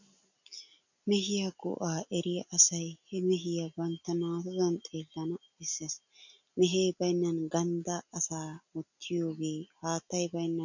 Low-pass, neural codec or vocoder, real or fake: 7.2 kHz; none; real